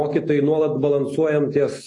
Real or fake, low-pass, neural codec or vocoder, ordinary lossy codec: real; 10.8 kHz; none; AAC, 32 kbps